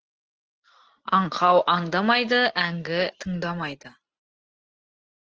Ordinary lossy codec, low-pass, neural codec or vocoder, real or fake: Opus, 16 kbps; 7.2 kHz; none; real